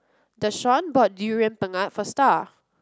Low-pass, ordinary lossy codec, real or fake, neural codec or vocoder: none; none; real; none